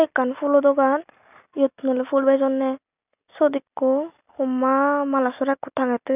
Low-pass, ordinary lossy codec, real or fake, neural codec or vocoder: 3.6 kHz; none; real; none